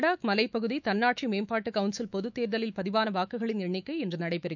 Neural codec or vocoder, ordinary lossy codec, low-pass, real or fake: autoencoder, 48 kHz, 128 numbers a frame, DAC-VAE, trained on Japanese speech; none; 7.2 kHz; fake